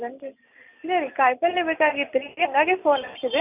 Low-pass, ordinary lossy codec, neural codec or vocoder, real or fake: 3.6 kHz; none; none; real